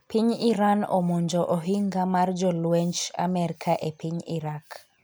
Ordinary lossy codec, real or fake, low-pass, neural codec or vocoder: none; real; none; none